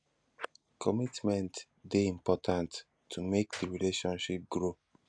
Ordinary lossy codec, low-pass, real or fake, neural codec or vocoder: none; 9.9 kHz; real; none